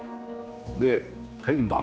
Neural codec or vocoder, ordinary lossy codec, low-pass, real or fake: codec, 16 kHz, 2 kbps, X-Codec, HuBERT features, trained on general audio; none; none; fake